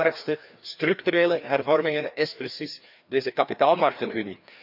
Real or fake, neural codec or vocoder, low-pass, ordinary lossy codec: fake; codec, 16 kHz, 2 kbps, FreqCodec, larger model; 5.4 kHz; none